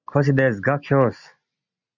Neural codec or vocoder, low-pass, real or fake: none; 7.2 kHz; real